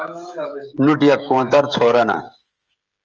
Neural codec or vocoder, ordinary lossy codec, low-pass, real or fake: codec, 16 kHz, 16 kbps, FreqCodec, smaller model; Opus, 24 kbps; 7.2 kHz; fake